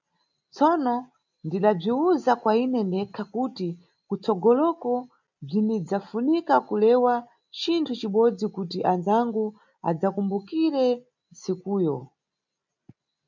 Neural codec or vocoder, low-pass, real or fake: none; 7.2 kHz; real